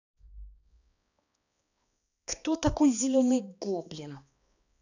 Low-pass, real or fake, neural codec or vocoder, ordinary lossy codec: 7.2 kHz; fake; codec, 16 kHz, 2 kbps, X-Codec, HuBERT features, trained on balanced general audio; none